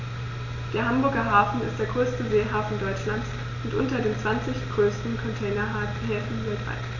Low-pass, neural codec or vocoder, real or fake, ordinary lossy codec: 7.2 kHz; none; real; none